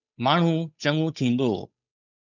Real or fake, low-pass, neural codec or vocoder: fake; 7.2 kHz; codec, 16 kHz, 8 kbps, FunCodec, trained on Chinese and English, 25 frames a second